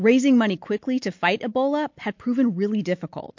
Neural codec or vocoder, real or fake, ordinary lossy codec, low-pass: none; real; MP3, 48 kbps; 7.2 kHz